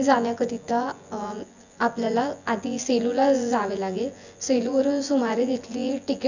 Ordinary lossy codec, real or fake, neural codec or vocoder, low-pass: none; fake; vocoder, 24 kHz, 100 mel bands, Vocos; 7.2 kHz